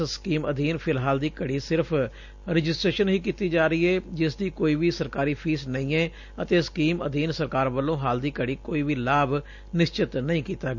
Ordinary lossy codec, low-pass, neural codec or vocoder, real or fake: none; 7.2 kHz; none; real